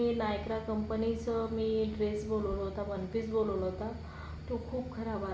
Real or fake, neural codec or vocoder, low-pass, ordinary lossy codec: real; none; none; none